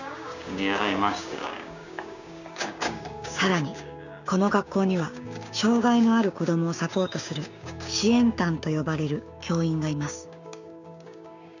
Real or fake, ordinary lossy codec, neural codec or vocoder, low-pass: fake; none; codec, 16 kHz, 6 kbps, DAC; 7.2 kHz